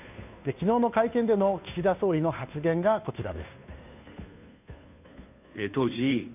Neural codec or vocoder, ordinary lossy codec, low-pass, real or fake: codec, 16 kHz, 2 kbps, FunCodec, trained on Chinese and English, 25 frames a second; none; 3.6 kHz; fake